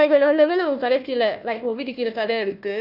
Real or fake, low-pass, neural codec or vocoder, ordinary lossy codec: fake; 5.4 kHz; codec, 16 kHz, 1 kbps, FunCodec, trained on Chinese and English, 50 frames a second; none